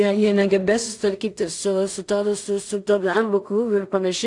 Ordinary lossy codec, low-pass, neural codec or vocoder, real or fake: AAC, 64 kbps; 10.8 kHz; codec, 16 kHz in and 24 kHz out, 0.4 kbps, LongCat-Audio-Codec, two codebook decoder; fake